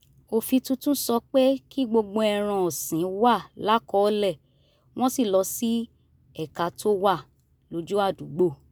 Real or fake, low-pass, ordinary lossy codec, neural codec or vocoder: real; none; none; none